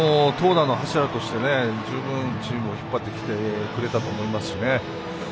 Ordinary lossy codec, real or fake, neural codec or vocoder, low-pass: none; real; none; none